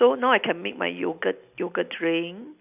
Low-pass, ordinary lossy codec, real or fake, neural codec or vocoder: 3.6 kHz; none; real; none